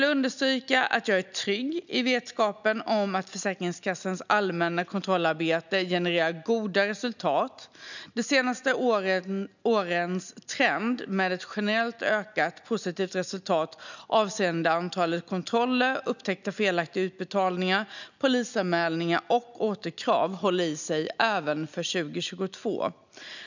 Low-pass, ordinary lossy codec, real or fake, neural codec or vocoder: 7.2 kHz; none; real; none